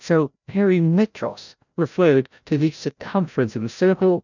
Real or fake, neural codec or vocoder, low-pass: fake; codec, 16 kHz, 0.5 kbps, FreqCodec, larger model; 7.2 kHz